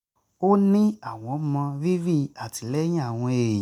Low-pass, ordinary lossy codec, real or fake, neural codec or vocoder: 19.8 kHz; none; real; none